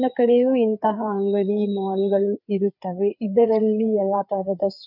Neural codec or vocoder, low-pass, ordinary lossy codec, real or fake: codec, 16 kHz, 4 kbps, FreqCodec, larger model; 5.4 kHz; none; fake